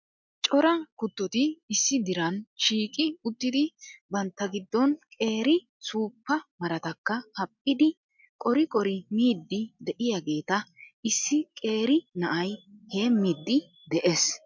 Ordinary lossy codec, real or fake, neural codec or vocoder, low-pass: MP3, 64 kbps; real; none; 7.2 kHz